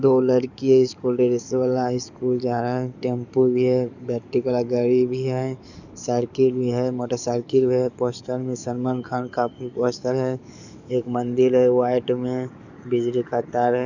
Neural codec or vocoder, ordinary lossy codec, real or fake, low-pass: codec, 44.1 kHz, 7.8 kbps, DAC; none; fake; 7.2 kHz